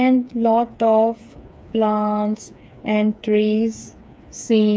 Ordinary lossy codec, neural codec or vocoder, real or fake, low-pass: none; codec, 16 kHz, 4 kbps, FreqCodec, smaller model; fake; none